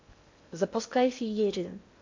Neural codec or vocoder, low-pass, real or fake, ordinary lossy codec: codec, 16 kHz in and 24 kHz out, 0.6 kbps, FocalCodec, streaming, 2048 codes; 7.2 kHz; fake; MP3, 48 kbps